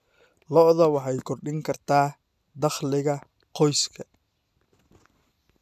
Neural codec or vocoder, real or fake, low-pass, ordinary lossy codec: none; real; 14.4 kHz; none